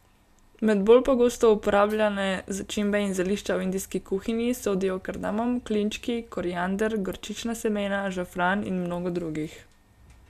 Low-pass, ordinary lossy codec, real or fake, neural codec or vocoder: 14.4 kHz; none; real; none